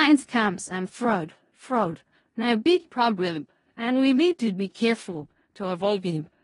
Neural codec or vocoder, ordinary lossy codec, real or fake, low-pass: codec, 16 kHz in and 24 kHz out, 0.4 kbps, LongCat-Audio-Codec, four codebook decoder; AAC, 32 kbps; fake; 10.8 kHz